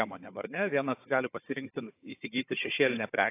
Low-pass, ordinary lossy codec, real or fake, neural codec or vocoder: 3.6 kHz; AAC, 24 kbps; fake; codec, 16 kHz, 4 kbps, FunCodec, trained on Chinese and English, 50 frames a second